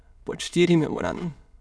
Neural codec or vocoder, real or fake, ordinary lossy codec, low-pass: autoencoder, 22.05 kHz, a latent of 192 numbers a frame, VITS, trained on many speakers; fake; none; none